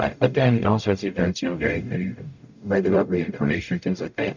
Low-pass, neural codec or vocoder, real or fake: 7.2 kHz; codec, 44.1 kHz, 0.9 kbps, DAC; fake